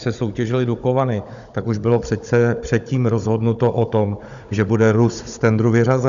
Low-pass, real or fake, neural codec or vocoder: 7.2 kHz; fake; codec, 16 kHz, 16 kbps, FunCodec, trained on Chinese and English, 50 frames a second